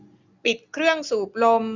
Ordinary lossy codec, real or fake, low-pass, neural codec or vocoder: Opus, 64 kbps; real; 7.2 kHz; none